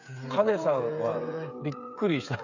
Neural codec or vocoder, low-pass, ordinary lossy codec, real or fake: codec, 16 kHz, 8 kbps, FreqCodec, smaller model; 7.2 kHz; none; fake